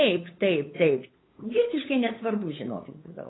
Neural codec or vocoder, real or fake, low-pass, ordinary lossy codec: codec, 16 kHz, 8 kbps, FunCodec, trained on LibriTTS, 25 frames a second; fake; 7.2 kHz; AAC, 16 kbps